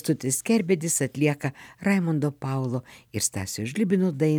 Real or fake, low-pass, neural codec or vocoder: real; 19.8 kHz; none